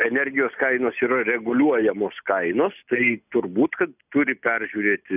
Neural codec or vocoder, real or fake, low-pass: none; real; 3.6 kHz